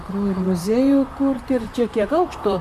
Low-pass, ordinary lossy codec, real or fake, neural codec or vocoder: 14.4 kHz; MP3, 96 kbps; fake; vocoder, 44.1 kHz, 128 mel bands, Pupu-Vocoder